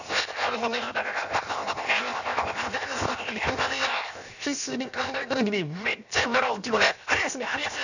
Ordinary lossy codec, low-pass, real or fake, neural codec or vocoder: none; 7.2 kHz; fake; codec, 16 kHz, 0.7 kbps, FocalCodec